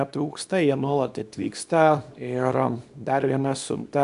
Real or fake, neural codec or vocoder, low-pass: fake; codec, 24 kHz, 0.9 kbps, WavTokenizer, small release; 10.8 kHz